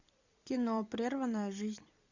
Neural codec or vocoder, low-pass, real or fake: vocoder, 44.1 kHz, 128 mel bands every 256 samples, BigVGAN v2; 7.2 kHz; fake